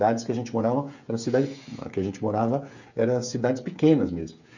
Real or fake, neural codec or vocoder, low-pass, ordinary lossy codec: fake; codec, 16 kHz, 8 kbps, FreqCodec, smaller model; 7.2 kHz; none